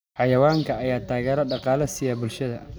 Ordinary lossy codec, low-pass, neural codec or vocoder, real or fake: none; none; none; real